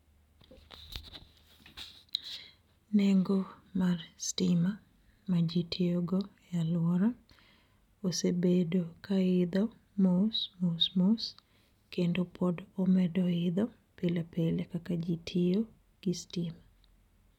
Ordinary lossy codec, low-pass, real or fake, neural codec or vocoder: none; 19.8 kHz; real; none